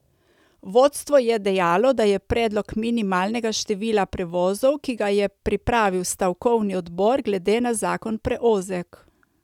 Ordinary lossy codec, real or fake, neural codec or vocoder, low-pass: none; real; none; 19.8 kHz